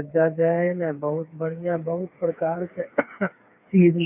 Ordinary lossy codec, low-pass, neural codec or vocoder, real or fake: none; 3.6 kHz; codec, 16 kHz, 4 kbps, FreqCodec, smaller model; fake